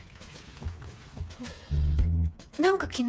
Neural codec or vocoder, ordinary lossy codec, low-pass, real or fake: codec, 16 kHz, 4 kbps, FreqCodec, smaller model; none; none; fake